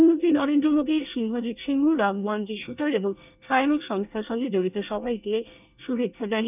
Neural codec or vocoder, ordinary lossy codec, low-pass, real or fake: codec, 24 kHz, 1 kbps, SNAC; none; 3.6 kHz; fake